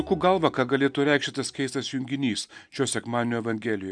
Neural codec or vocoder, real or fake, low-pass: none; real; 9.9 kHz